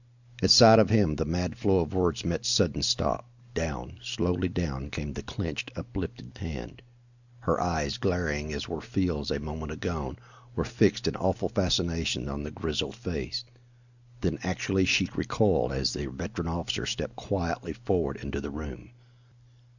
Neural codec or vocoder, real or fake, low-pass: none; real; 7.2 kHz